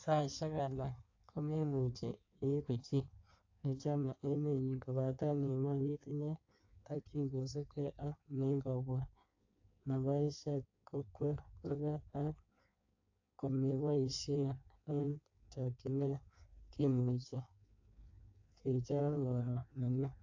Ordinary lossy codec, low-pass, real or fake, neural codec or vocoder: AAC, 48 kbps; 7.2 kHz; fake; codec, 16 kHz in and 24 kHz out, 1.1 kbps, FireRedTTS-2 codec